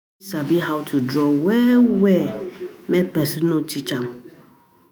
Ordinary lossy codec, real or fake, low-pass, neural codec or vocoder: none; fake; none; autoencoder, 48 kHz, 128 numbers a frame, DAC-VAE, trained on Japanese speech